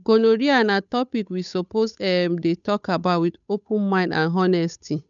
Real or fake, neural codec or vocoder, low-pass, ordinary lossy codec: fake; codec, 16 kHz, 8 kbps, FunCodec, trained on Chinese and English, 25 frames a second; 7.2 kHz; none